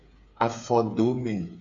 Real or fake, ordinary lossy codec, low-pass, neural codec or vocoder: fake; Opus, 64 kbps; 7.2 kHz; codec, 16 kHz, 8 kbps, FreqCodec, smaller model